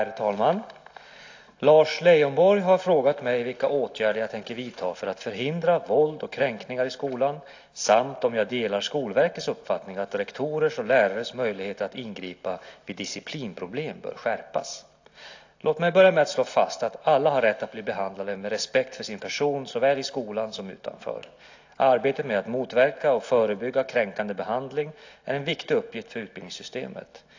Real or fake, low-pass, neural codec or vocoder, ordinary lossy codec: real; 7.2 kHz; none; AAC, 48 kbps